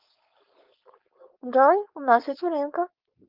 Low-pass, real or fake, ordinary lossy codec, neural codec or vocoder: 5.4 kHz; fake; Opus, 24 kbps; codec, 16 kHz, 4.8 kbps, FACodec